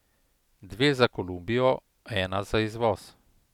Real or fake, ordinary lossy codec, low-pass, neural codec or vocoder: real; none; 19.8 kHz; none